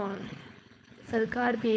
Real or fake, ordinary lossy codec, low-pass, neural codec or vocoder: fake; none; none; codec, 16 kHz, 4.8 kbps, FACodec